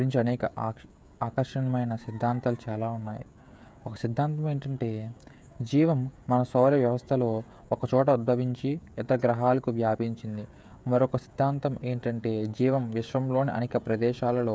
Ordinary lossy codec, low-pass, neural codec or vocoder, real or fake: none; none; codec, 16 kHz, 16 kbps, FreqCodec, smaller model; fake